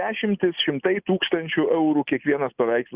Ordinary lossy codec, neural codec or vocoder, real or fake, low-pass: Opus, 64 kbps; none; real; 3.6 kHz